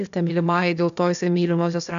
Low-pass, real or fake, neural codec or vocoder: 7.2 kHz; fake; codec, 16 kHz, 0.8 kbps, ZipCodec